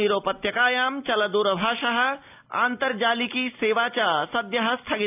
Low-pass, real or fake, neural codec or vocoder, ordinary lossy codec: 3.6 kHz; real; none; AAC, 32 kbps